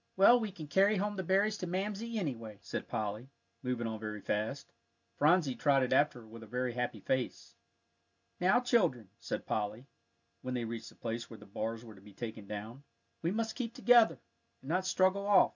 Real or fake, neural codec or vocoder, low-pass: real; none; 7.2 kHz